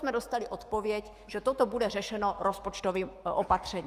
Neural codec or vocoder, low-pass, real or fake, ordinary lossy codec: autoencoder, 48 kHz, 128 numbers a frame, DAC-VAE, trained on Japanese speech; 14.4 kHz; fake; Opus, 32 kbps